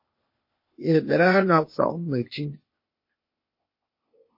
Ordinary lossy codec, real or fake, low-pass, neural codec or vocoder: MP3, 24 kbps; fake; 5.4 kHz; codec, 16 kHz, 0.8 kbps, ZipCodec